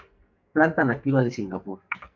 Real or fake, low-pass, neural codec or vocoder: fake; 7.2 kHz; codec, 44.1 kHz, 2.6 kbps, SNAC